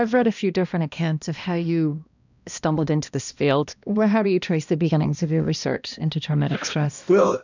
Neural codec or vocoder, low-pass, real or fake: codec, 16 kHz, 1 kbps, X-Codec, HuBERT features, trained on balanced general audio; 7.2 kHz; fake